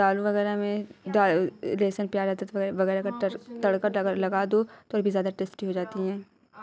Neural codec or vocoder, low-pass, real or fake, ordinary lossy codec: none; none; real; none